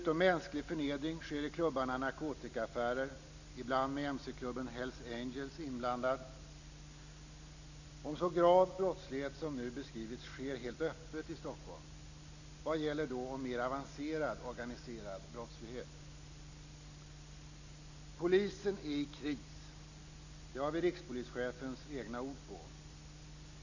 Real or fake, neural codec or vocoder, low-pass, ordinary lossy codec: real; none; 7.2 kHz; none